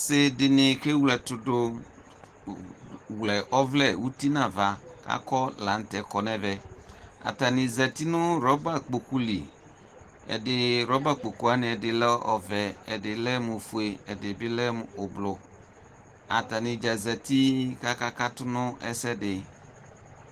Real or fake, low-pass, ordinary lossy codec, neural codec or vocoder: real; 14.4 kHz; Opus, 16 kbps; none